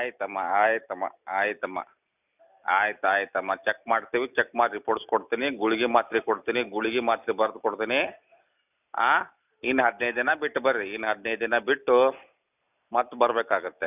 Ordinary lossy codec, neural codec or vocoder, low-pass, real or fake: none; none; 3.6 kHz; real